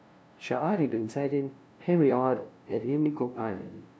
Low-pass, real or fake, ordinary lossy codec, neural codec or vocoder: none; fake; none; codec, 16 kHz, 0.5 kbps, FunCodec, trained on LibriTTS, 25 frames a second